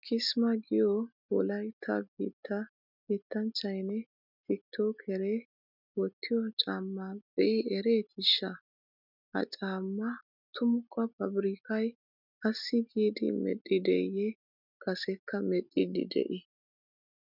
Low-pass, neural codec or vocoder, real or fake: 5.4 kHz; none; real